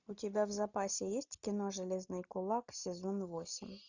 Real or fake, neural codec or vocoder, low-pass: real; none; 7.2 kHz